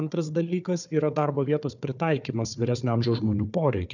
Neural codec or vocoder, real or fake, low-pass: codec, 16 kHz, 4 kbps, FunCodec, trained on Chinese and English, 50 frames a second; fake; 7.2 kHz